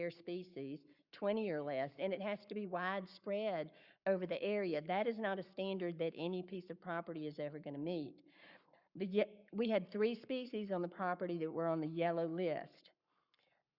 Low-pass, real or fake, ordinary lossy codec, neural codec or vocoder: 5.4 kHz; fake; Opus, 64 kbps; codec, 44.1 kHz, 7.8 kbps, Pupu-Codec